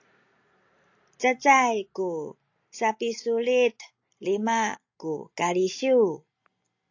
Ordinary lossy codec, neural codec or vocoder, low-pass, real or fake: AAC, 48 kbps; none; 7.2 kHz; real